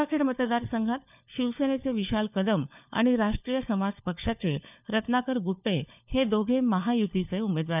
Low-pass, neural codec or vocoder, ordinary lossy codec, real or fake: 3.6 kHz; codec, 16 kHz, 4 kbps, FunCodec, trained on LibriTTS, 50 frames a second; none; fake